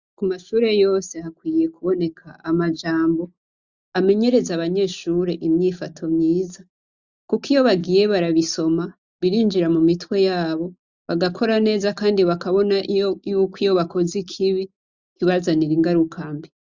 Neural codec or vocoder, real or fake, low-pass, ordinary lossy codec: none; real; 7.2 kHz; Opus, 64 kbps